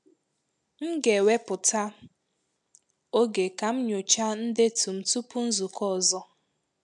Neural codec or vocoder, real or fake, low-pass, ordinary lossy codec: none; real; 10.8 kHz; none